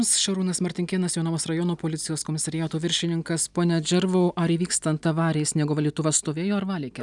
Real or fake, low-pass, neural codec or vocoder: real; 10.8 kHz; none